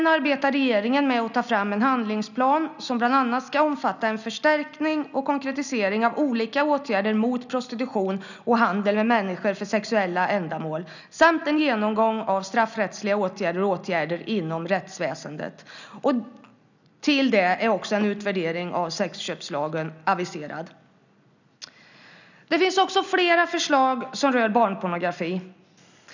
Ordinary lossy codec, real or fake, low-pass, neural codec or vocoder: none; real; 7.2 kHz; none